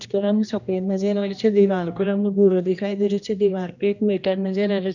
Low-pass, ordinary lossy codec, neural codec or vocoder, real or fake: 7.2 kHz; none; codec, 16 kHz, 1 kbps, X-Codec, HuBERT features, trained on general audio; fake